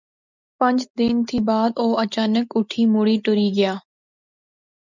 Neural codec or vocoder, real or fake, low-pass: none; real; 7.2 kHz